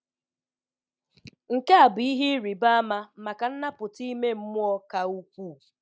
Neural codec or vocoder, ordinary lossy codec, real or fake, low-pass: none; none; real; none